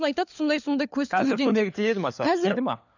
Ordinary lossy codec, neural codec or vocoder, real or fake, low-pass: none; codec, 16 kHz, 4 kbps, FunCodec, trained on LibriTTS, 50 frames a second; fake; 7.2 kHz